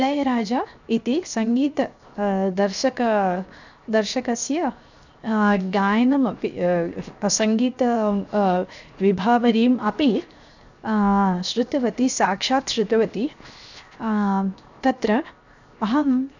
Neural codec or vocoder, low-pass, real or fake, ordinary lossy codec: codec, 16 kHz, 0.7 kbps, FocalCodec; 7.2 kHz; fake; none